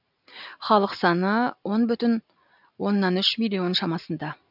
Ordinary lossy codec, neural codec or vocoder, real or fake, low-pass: none; none; real; 5.4 kHz